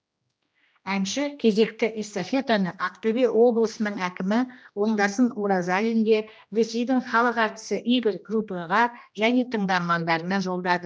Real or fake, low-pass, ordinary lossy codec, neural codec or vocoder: fake; none; none; codec, 16 kHz, 1 kbps, X-Codec, HuBERT features, trained on general audio